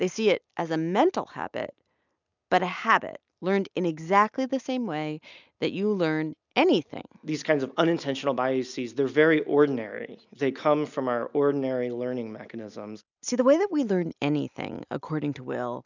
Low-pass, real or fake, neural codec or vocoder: 7.2 kHz; real; none